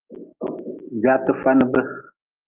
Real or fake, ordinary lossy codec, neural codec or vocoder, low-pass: real; Opus, 32 kbps; none; 3.6 kHz